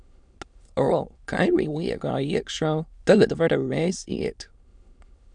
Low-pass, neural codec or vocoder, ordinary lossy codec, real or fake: 9.9 kHz; autoencoder, 22.05 kHz, a latent of 192 numbers a frame, VITS, trained on many speakers; MP3, 96 kbps; fake